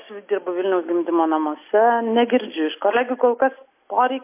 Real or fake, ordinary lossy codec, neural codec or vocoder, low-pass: real; MP3, 24 kbps; none; 3.6 kHz